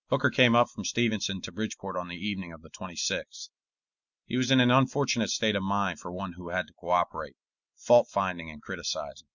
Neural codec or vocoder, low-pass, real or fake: none; 7.2 kHz; real